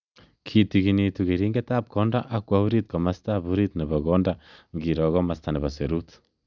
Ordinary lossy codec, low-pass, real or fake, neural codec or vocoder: none; 7.2 kHz; real; none